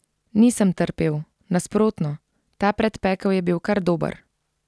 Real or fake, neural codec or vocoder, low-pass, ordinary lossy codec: real; none; none; none